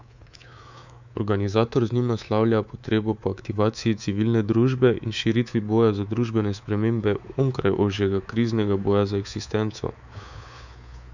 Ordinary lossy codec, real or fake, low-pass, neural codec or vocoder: none; fake; 7.2 kHz; codec, 24 kHz, 3.1 kbps, DualCodec